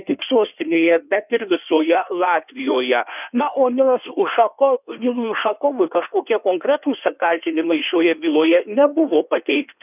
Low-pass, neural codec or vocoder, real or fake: 3.6 kHz; codec, 16 kHz in and 24 kHz out, 1.1 kbps, FireRedTTS-2 codec; fake